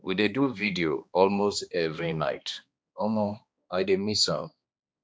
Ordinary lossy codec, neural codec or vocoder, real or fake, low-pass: none; codec, 16 kHz, 2 kbps, X-Codec, HuBERT features, trained on balanced general audio; fake; none